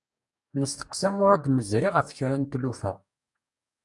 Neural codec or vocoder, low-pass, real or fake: codec, 44.1 kHz, 2.6 kbps, DAC; 10.8 kHz; fake